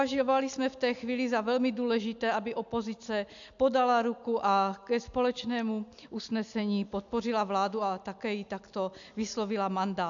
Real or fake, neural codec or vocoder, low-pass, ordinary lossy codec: real; none; 7.2 kHz; AAC, 64 kbps